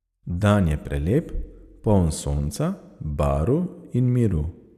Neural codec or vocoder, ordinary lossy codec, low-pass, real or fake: vocoder, 44.1 kHz, 128 mel bands every 256 samples, BigVGAN v2; none; 14.4 kHz; fake